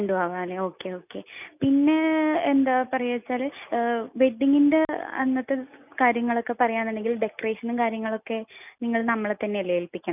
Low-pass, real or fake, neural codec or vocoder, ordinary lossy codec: 3.6 kHz; real; none; none